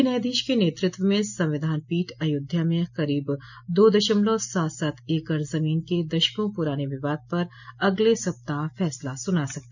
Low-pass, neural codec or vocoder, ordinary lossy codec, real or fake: 7.2 kHz; none; none; real